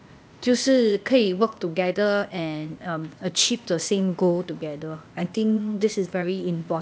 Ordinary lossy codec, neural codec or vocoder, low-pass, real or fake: none; codec, 16 kHz, 0.8 kbps, ZipCodec; none; fake